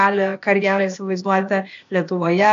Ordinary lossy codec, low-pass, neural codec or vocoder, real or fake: AAC, 64 kbps; 7.2 kHz; codec, 16 kHz, 0.8 kbps, ZipCodec; fake